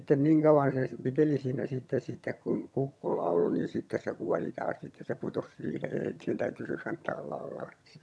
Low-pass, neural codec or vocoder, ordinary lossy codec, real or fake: none; vocoder, 22.05 kHz, 80 mel bands, HiFi-GAN; none; fake